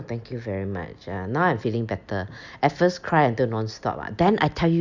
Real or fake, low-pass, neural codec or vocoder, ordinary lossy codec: real; 7.2 kHz; none; Opus, 64 kbps